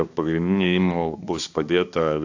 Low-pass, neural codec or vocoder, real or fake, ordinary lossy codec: 7.2 kHz; codec, 16 kHz, 2 kbps, X-Codec, HuBERT features, trained on balanced general audio; fake; AAC, 48 kbps